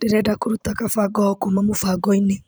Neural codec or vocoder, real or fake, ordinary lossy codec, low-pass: vocoder, 44.1 kHz, 128 mel bands every 512 samples, BigVGAN v2; fake; none; none